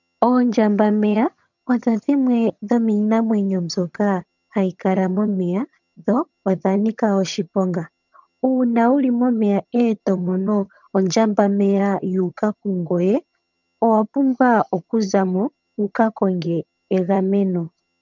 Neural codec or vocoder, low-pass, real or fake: vocoder, 22.05 kHz, 80 mel bands, HiFi-GAN; 7.2 kHz; fake